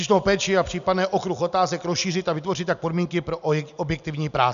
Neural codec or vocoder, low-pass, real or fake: none; 7.2 kHz; real